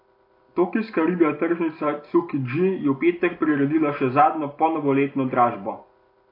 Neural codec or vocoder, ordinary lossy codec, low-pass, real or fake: none; AAC, 32 kbps; 5.4 kHz; real